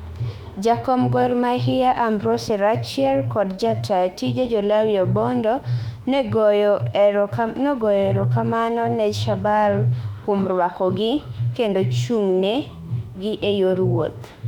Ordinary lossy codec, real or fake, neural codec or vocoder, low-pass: none; fake; autoencoder, 48 kHz, 32 numbers a frame, DAC-VAE, trained on Japanese speech; 19.8 kHz